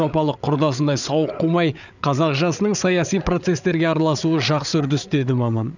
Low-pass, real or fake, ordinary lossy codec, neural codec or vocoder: 7.2 kHz; fake; none; codec, 16 kHz, 8 kbps, FreqCodec, larger model